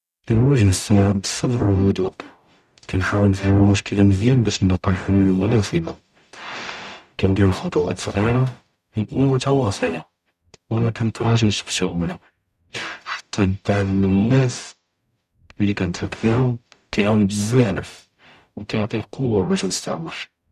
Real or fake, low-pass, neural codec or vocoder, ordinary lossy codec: fake; 14.4 kHz; codec, 44.1 kHz, 0.9 kbps, DAC; none